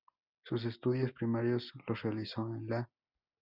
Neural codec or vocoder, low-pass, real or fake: none; 5.4 kHz; real